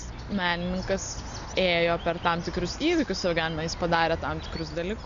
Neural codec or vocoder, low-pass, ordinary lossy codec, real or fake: none; 7.2 kHz; MP3, 64 kbps; real